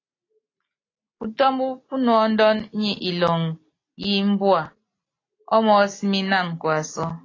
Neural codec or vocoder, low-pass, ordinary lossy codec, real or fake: none; 7.2 kHz; AAC, 32 kbps; real